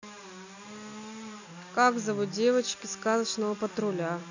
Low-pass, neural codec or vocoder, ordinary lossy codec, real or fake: 7.2 kHz; none; none; real